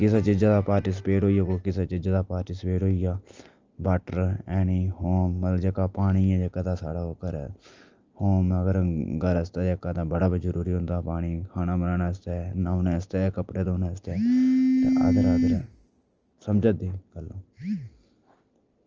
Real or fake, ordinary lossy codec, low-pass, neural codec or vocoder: real; Opus, 24 kbps; 7.2 kHz; none